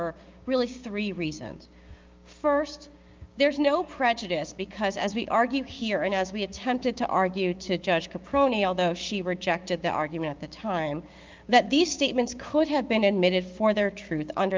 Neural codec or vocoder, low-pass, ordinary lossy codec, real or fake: codec, 16 kHz, 6 kbps, DAC; 7.2 kHz; Opus, 24 kbps; fake